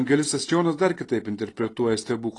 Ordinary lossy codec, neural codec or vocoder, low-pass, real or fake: AAC, 32 kbps; none; 10.8 kHz; real